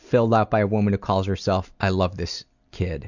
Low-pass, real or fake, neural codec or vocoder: 7.2 kHz; real; none